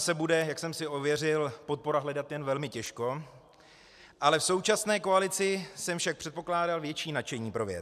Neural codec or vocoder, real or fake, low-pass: none; real; 14.4 kHz